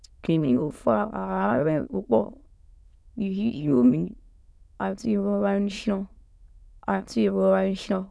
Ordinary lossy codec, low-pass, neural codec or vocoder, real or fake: none; none; autoencoder, 22.05 kHz, a latent of 192 numbers a frame, VITS, trained on many speakers; fake